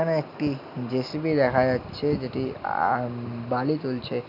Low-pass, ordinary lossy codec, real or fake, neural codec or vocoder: 5.4 kHz; none; real; none